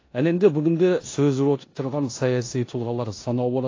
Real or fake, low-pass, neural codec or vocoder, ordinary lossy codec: fake; 7.2 kHz; codec, 16 kHz in and 24 kHz out, 0.9 kbps, LongCat-Audio-Codec, four codebook decoder; AAC, 32 kbps